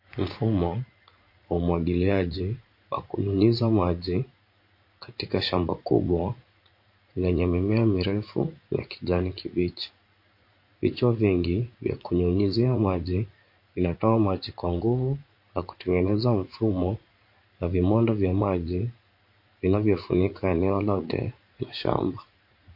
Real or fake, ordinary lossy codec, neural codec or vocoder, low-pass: fake; MP3, 32 kbps; vocoder, 44.1 kHz, 80 mel bands, Vocos; 5.4 kHz